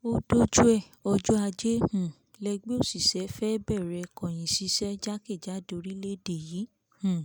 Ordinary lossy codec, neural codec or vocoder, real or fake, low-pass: none; none; real; none